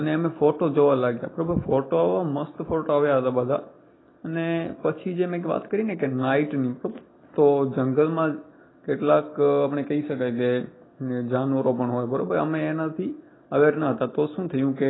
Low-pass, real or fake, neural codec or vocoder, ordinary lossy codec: 7.2 kHz; real; none; AAC, 16 kbps